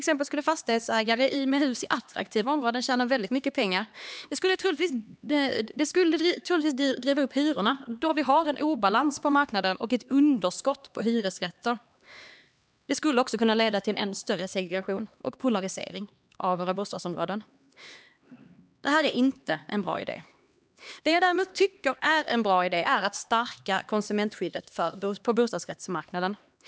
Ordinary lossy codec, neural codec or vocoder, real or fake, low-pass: none; codec, 16 kHz, 2 kbps, X-Codec, HuBERT features, trained on LibriSpeech; fake; none